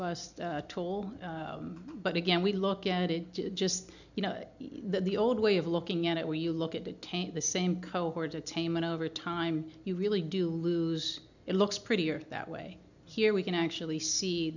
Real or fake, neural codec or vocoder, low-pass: real; none; 7.2 kHz